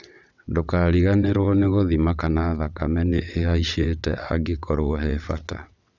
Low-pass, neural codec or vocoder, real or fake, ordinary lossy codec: 7.2 kHz; vocoder, 22.05 kHz, 80 mel bands, WaveNeXt; fake; none